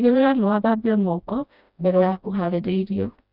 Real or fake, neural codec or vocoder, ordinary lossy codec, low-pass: fake; codec, 16 kHz, 1 kbps, FreqCodec, smaller model; none; 5.4 kHz